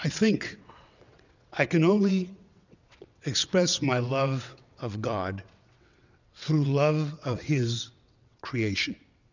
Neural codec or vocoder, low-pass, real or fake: vocoder, 22.05 kHz, 80 mel bands, WaveNeXt; 7.2 kHz; fake